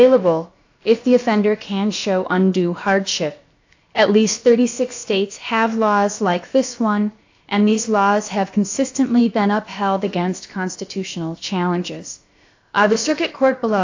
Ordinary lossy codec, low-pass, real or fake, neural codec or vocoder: AAC, 48 kbps; 7.2 kHz; fake; codec, 16 kHz, about 1 kbps, DyCAST, with the encoder's durations